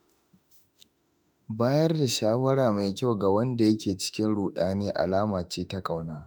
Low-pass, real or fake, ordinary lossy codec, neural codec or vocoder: none; fake; none; autoencoder, 48 kHz, 32 numbers a frame, DAC-VAE, trained on Japanese speech